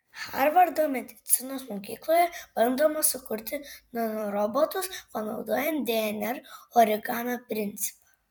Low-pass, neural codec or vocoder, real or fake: 19.8 kHz; vocoder, 44.1 kHz, 128 mel bands every 256 samples, BigVGAN v2; fake